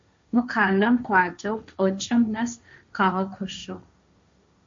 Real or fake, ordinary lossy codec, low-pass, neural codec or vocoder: fake; MP3, 48 kbps; 7.2 kHz; codec, 16 kHz, 1.1 kbps, Voila-Tokenizer